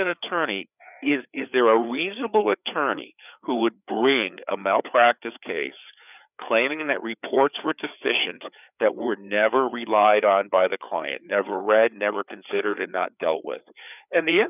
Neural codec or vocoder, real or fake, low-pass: codec, 16 kHz, 4 kbps, FreqCodec, larger model; fake; 3.6 kHz